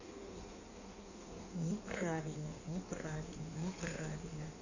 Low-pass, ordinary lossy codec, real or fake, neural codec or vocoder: 7.2 kHz; none; fake; codec, 16 kHz in and 24 kHz out, 1.1 kbps, FireRedTTS-2 codec